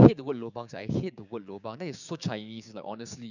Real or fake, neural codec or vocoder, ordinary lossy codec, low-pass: fake; codec, 16 kHz, 6 kbps, DAC; none; 7.2 kHz